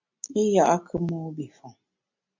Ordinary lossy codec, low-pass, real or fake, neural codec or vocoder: MP3, 64 kbps; 7.2 kHz; real; none